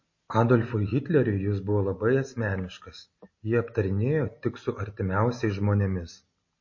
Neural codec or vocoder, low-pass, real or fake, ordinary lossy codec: none; 7.2 kHz; real; MP3, 32 kbps